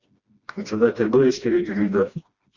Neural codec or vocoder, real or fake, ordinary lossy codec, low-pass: codec, 16 kHz, 1 kbps, FreqCodec, smaller model; fake; Opus, 64 kbps; 7.2 kHz